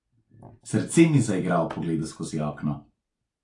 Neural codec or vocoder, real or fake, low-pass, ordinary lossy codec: none; real; 10.8 kHz; AAC, 48 kbps